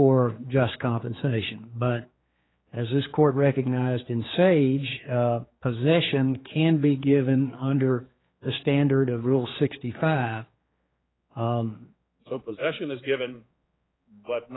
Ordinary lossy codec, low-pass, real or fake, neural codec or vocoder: AAC, 16 kbps; 7.2 kHz; fake; codec, 16 kHz, 4 kbps, X-Codec, HuBERT features, trained on general audio